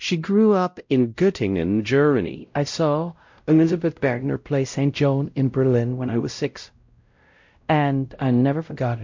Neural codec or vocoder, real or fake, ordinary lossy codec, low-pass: codec, 16 kHz, 0.5 kbps, X-Codec, WavLM features, trained on Multilingual LibriSpeech; fake; MP3, 48 kbps; 7.2 kHz